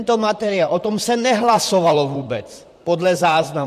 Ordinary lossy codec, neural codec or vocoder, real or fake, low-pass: MP3, 64 kbps; vocoder, 44.1 kHz, 128 mel bands, Pupu-Vocoder; fake; 14.4 kHz